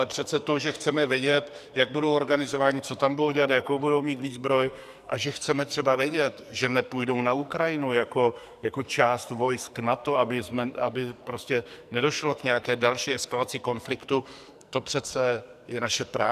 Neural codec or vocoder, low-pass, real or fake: codec, 44.1 kHz, 2.6 kbps, SNAC; 14.4 kHz; fake